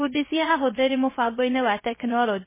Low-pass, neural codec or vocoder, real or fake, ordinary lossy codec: 3.6 kHz; codec, 16 kHz, 0.3 kbps, FocalCodec; fake; MP3, 16 kbps